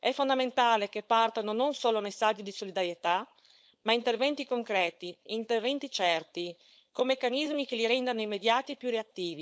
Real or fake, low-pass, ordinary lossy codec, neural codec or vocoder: fake; none; none; codec, 16 kHz, 4.8 kbps, FACodec